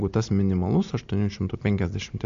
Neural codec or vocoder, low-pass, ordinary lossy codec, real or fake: none; 7.2 kHz; MP3, 48 kbps; real